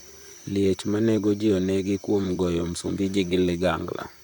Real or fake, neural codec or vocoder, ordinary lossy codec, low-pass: fake; vocoder, 44.1 kHz, 128 mel bands, Pupu-Vocoder; none; 19.8 kHz